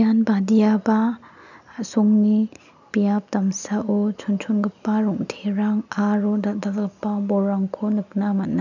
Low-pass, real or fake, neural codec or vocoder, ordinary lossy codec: 7.2 kHz; real; none; none